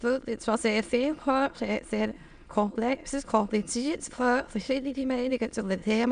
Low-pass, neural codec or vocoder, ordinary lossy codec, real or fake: 9.9 kHz; autoencoder, 22.05 kHz, a latent of 192 numbers a frame, VITS, trained on many speakers; none; fake